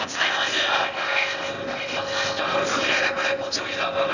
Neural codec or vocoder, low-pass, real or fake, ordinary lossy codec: codec, 16 kHz in and 24 kHz out, 0.6 kbps, FocalCodec, streaming, 4096 codes; 7.2 kHz; fake; none